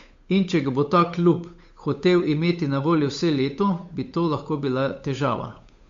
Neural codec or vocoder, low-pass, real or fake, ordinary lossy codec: codec, 16 kHz, 8 kbps, FunCodec, trained on Chinese and English, 25 frames a second; 7.2 kHz; fake; MP3, 48 kbps